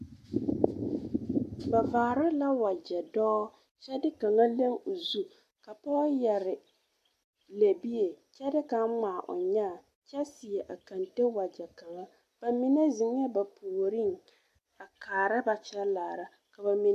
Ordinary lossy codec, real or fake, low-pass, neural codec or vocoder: AAC, 64 kbps; real; 14.4 kHz; none